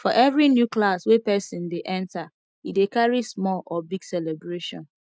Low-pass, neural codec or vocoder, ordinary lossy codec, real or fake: none; none; none; real